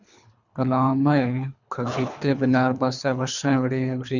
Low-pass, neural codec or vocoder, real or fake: 7.2 kHz; codec, 24 kHz, 3 kbps, HILCodec; fake